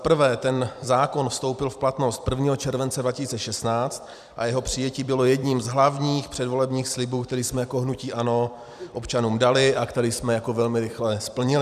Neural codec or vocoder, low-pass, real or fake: vocoder, 44.1 kHz, 128 mel bands every 256 samples, BigVGAN v2; 14.4 kHz; fake